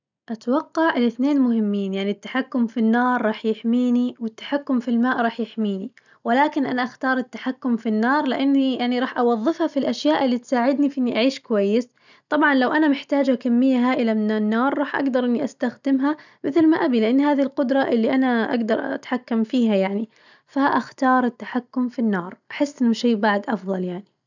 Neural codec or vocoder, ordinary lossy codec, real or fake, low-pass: none; none; real; 7.2 kHz